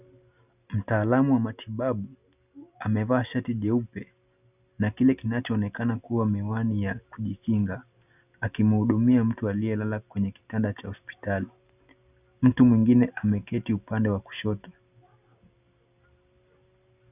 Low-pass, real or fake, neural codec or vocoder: 3.6 kHz; real; none